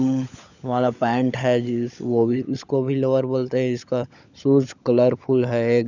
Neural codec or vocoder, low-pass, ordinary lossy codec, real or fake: codec, 16 kHz, 16 kbps, FunCodec, trained on LibriTTS, 50 frames a second; 7.2 kHz; none; fake